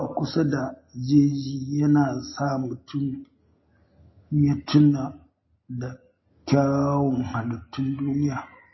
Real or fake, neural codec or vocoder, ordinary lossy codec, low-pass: real; none; MP3, 24 kbps; 7.2 kHz